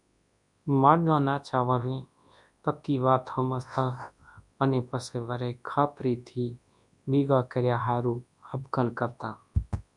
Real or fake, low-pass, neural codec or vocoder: fake; 10.8 kHz; codec, 24 kHz, 0.9 kbps, WavTokenizer, large speech release